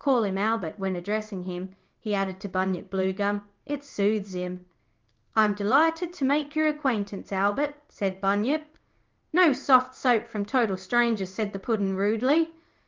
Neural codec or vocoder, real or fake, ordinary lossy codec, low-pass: codec, 16 kHz in and 24 kHz out, 1 kbps, XY-Tokenizer; fake; Opus, 32 kbps; 7.2 kHz